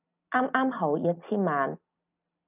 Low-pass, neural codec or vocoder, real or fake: 3.6 kHz; none; real